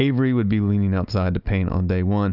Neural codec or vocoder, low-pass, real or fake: none; 5.4 kHz; real